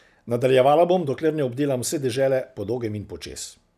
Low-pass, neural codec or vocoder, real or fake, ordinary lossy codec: 14.4 kHz; none; real; none